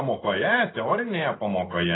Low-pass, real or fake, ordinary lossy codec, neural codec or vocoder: 7.2 kHz; real; AAC, 16 kbps; none